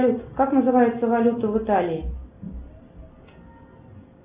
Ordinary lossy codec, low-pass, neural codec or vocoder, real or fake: Opus, 64 kbps; 3.6 kHz; none; real